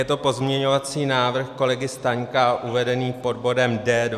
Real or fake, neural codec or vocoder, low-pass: real; none; 14.4 kHz